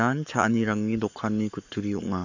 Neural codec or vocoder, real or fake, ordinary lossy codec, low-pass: codec, 44.1 kHz, 7.8 kbps, Pupu-Codec; fake; none; 7.2 kHz